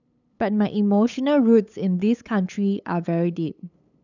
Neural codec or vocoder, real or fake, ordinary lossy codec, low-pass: codec, 16 kHz, 8 kbps, FunCodec, trained on LibriTTS, 25 frames a second; fake; none; 7.2 kHz